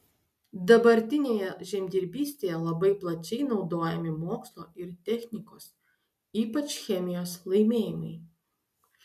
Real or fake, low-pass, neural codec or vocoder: real; 14.4 kHz; none